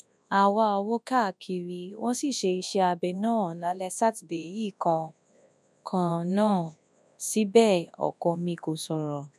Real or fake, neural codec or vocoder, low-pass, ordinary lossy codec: fake; codec, 24 kHz, 0.9 kbps, WavTokenizer, large speech release; none; none